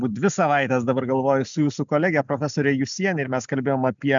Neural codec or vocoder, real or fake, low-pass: none; real; 7.2 kHz